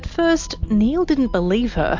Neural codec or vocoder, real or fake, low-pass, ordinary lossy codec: none; real; 7.2 kHz; MP3, 48 kbps